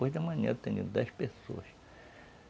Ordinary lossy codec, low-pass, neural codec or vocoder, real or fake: none; none; none; real